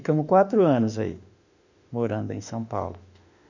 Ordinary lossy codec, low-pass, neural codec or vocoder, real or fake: none; 7.2 kHz; autoencoder, 48 kHz, 32 numbers a frame, DAC-VAE, trained on Japanese speech; fake